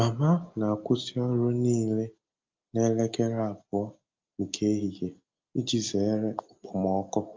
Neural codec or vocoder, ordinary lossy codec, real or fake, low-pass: none; Opus, 24 kbps; real; 7.2 kHz